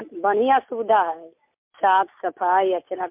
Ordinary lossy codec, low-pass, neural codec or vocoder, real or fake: MP3, 32 kbps; 3.6 kHz; none; real